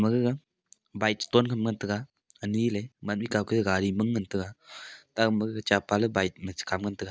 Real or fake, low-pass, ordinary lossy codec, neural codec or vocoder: real; none; none; none